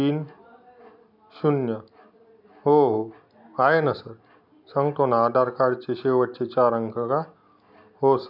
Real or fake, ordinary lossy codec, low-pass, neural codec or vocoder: real; none; 5.4 kHz; none